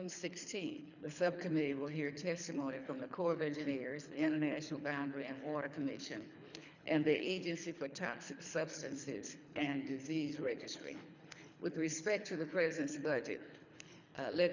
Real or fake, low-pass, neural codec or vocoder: fake; 7.2 kHz; codec, 24 kHz, 3 kbps, HILCodec